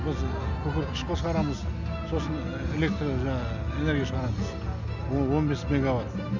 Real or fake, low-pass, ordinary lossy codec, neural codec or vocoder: real; 7.2 kHz; none; none